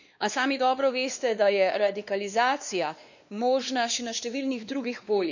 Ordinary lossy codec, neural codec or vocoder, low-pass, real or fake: none; codec, 16 kHz, 2 kbps, X-Codec, WavLM features, trained on Multilingual LibriSpeech; 7.2 kHz; fake